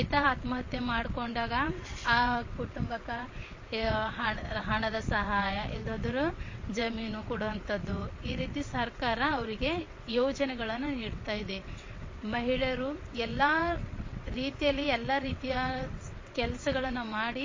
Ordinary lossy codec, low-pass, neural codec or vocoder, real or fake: MP3, 32 kbps; 7.2 kHz; vocoder, 22.05 kHz, 80 mel bands, WaveNeXt; fake